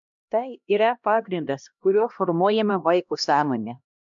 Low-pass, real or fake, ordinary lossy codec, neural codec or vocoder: 7.2 kHz; fake; MP3, 64 kbps; codec, 16 kHz, 1 kbps, X-Codec, HuBERT features, trained on LibriSpeech